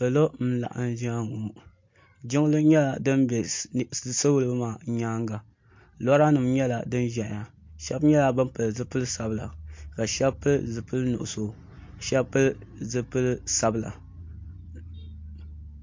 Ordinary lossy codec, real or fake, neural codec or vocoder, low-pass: MP3, 48 kbps; real; none; 7.2 kHz